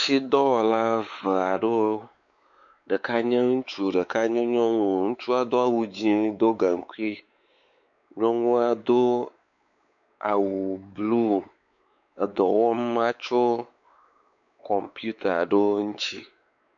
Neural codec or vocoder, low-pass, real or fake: codec, 16 kHz, 4 kbps, X-Codec, WavLM features, trained on Multilingual LibriSpeech; 7.2 kHz; fake